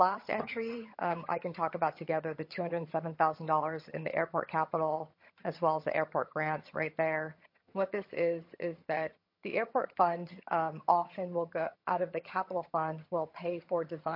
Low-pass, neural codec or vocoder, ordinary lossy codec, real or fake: 5.4 kHz; vocoder, 22.05 kHz, 80 mel bands, HiFi-GAN; MP3, 32 kbps; fake